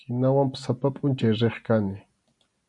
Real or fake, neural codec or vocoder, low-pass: real; none; 10.8 kHz